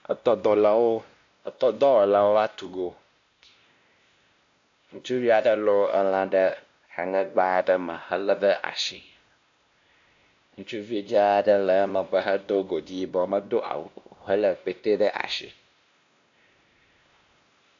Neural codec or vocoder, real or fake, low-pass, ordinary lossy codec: codec, 16 kHz, 1 kbps, X-Codec, WavLM features, trained on Multilingual LibriSpeech; fake; 7.2 kHz; AAC, 64 kbps